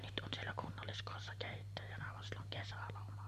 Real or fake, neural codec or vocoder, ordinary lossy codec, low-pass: real; none; MP3, 64 kbps; 14.4 kHz